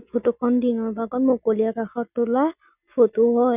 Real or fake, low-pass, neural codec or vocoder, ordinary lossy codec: real; 3.6 kHz; none; none